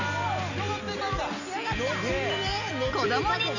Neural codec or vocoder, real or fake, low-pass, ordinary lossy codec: none; real; 7.2 kHz; none